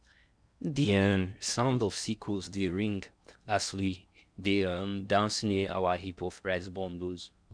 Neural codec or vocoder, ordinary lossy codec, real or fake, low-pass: codec, 16 kHz in and 24 kHz out, 0.6 kbps, FocalCodec, streaming, 2048 codes; none; fake; 9.9 kHz